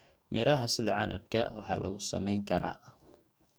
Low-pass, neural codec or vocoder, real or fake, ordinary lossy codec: none; codec, 44.1 kHz, 2.6 kbps, DAC; fake; none